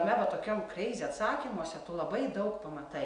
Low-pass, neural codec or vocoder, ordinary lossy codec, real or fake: 9.9 kHz; none; AAC, 64 kbps; real